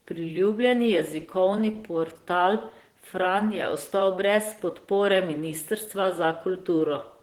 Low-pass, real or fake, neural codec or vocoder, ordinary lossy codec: 19.8 kHz; fake; vocoder, 44.1 kHz, 128 mel bands, Pupu-Vocoder; Opus, 16 kbps